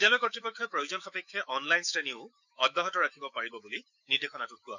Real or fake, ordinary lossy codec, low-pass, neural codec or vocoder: fake; none; 7.2 kHz; autoencoder, 48 kHz, 128 numbers a frame, DAC-VAE, trained on Japanese speech